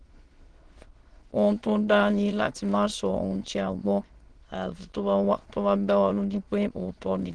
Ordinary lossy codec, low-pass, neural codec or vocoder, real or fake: Opus, 16 kbps; 9.9 kHz; autoencoder, 22.05 kHz, a latent of 192 numbers a frame, VITS, trained on many speakers; fake